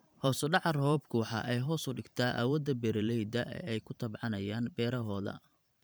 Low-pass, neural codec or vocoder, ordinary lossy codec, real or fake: none; vocoder, 44.1 kHz, 128 mel bands every 512 samples, BigVGAN v2; none; fake